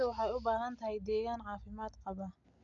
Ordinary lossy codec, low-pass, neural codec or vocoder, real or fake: MP3, 96 kbps; 7.2 kHz; none; real